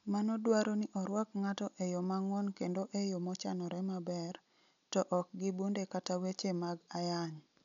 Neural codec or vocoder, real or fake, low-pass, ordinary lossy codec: none; real; 7.2 kHz; none